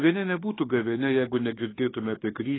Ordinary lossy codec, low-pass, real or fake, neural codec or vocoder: AAC, 16 kbps; 7.2 kHz; fake; autoencoder, 48 kHz, 32 numbers a frame, DAC-VAE, trained on Japanese speech